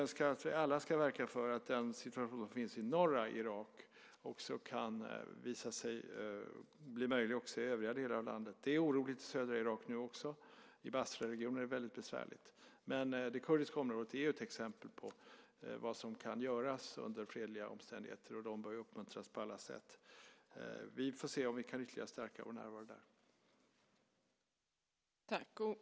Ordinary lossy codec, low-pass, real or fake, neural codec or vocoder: none; none; real; none